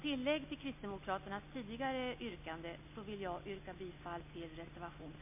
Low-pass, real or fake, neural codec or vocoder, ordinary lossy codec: 3.6 kHz; real; none; MP3, 32 kbps